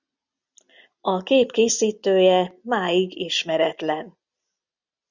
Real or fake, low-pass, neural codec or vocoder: real; 7.2 kHz; none